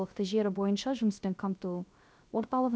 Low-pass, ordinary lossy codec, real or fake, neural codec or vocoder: none; none; fake; codec, 16 kHz, 0.3 kbps, FocalCodec